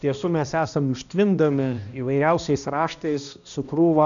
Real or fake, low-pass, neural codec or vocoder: fake; 7.2 kHz; codec, 16 kHz, 1 kbps, X-Codec, HuBERT features, trained on balanced general audio